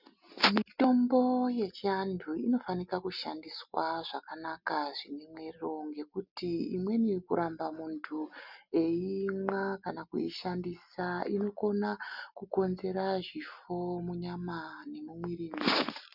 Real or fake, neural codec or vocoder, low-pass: real; none; 5.4 kHz